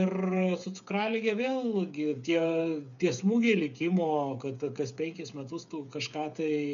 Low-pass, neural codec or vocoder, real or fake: 7.2 kHz; codec, 16 kHz, 8 kbps, FreqCodec, smaller model; fake